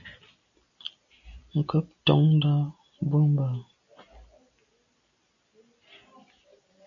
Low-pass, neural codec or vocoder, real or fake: 7.2 kHz; none; real